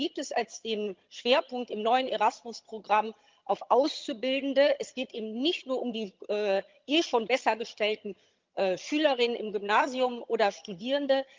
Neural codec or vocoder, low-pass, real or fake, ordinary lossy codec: vocoder, 22.05 kHz, 80 mel bands, HiFi-GAN; 7.2 kHz; fake; Opus, 24 kbps